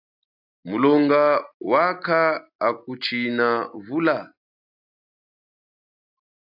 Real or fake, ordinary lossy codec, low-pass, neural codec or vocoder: real; AAC, 48 kbps; 5.4 kHz; none